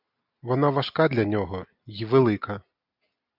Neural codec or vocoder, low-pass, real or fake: none; 5.4 kHz; real